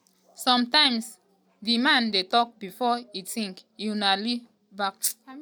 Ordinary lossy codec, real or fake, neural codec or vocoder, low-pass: none; real; none; none